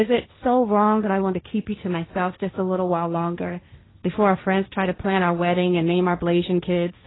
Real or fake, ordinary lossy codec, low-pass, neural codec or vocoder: fake; AAC, 16 kbps; 7.2 kHz; codec, 16 kHz, 1.1 kbps, Voila-Tokenizer